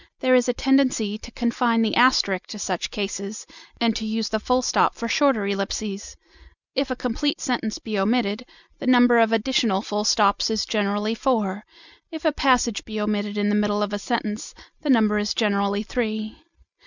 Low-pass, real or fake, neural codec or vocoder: 7.2 kHz; real; none